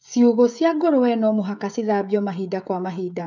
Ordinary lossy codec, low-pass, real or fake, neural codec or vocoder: none; 7.2 kHz; fake; codec, 16 kHz, 16 kbps, FreqCodec, smaller model